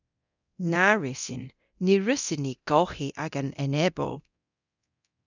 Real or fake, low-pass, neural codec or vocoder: fake; 7.2 kHz; codec, 24 kHz, 0.9 kbps, DualCodec